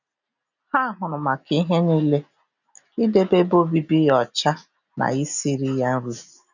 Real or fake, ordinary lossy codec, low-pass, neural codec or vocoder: real; none; 7.2 kHz; none